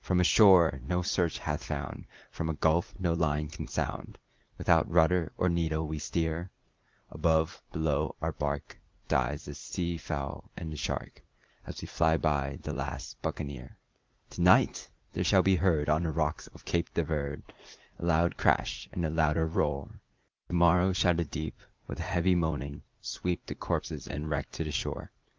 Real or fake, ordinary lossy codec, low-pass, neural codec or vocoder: fake; Opus, 32 kbps; 7.2 kHz; vocoder, 44.1 kHz, 80 mel bands, Vocos